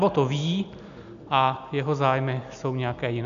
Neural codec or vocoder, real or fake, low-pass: none; real; 7.2 kHz